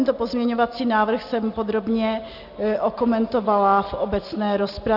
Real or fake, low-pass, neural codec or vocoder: real; 5.4 kHz; none